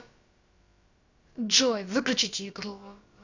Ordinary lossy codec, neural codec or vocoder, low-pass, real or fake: Opus, 64 kbps; codec, 16 kHz, about 1 kbps, DyCAST, with the encoder's durations; 7.2 kHz; fake